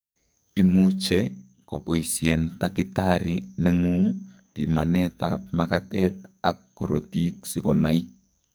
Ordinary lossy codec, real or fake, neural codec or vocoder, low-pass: none; fake; codec, 44.1 kHz, 2.6 kbps, SNAC; none